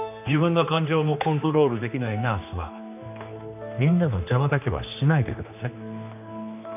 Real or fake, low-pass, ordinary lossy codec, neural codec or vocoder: fake; 3.6 kHz; none; codec, 16 kHz, 2 kbps, X-Codec, HuBERT features, trained on general audio